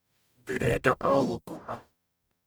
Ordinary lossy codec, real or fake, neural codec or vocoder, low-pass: none; fake; codec, 44.1 kHz, 0.9 kbps, DAC; none